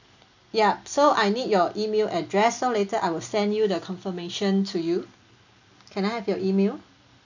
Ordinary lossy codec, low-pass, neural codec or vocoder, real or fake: none; 7.2 kHz; none; real